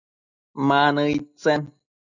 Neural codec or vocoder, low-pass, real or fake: none; 7.2 kHz; real